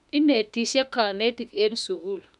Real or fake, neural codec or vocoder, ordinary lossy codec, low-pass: fake; autoencoder, 48 kHz, 32 numbers a frame, DAC-VAE, trained on Japanese speech; none; 10.8 kHz